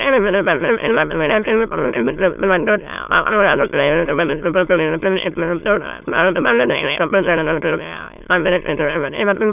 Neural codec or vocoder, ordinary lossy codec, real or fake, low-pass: autoencoder, 22.05 kHz, a latent of 192 numbers a frame, VITS, trained on many speakers; none; fake; 3.6 kHz